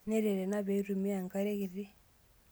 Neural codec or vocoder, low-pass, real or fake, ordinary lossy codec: none; none; real; none